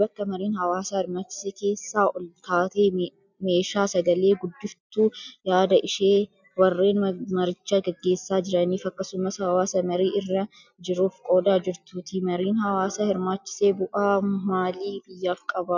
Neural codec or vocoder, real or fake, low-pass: none; real; 7.2 kHz